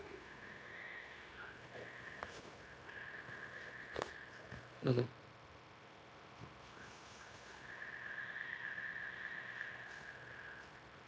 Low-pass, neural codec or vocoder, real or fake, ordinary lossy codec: none; codec, 16 kHz, 0.9 kbps, LongCat-Audio-Codec; fake; none